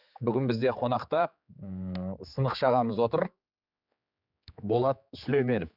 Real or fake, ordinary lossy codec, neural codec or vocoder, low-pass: fake; none; codec, 16 kHz, 4 kbps, X-Codec, HuBERT features, trained on general audio; 5.4 kHz